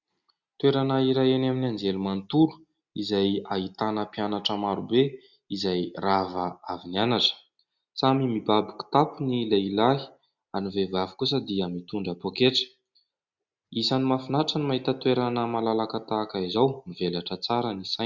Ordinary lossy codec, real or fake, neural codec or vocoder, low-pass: Opus, 64 kbps; real; none; 7.2 kHz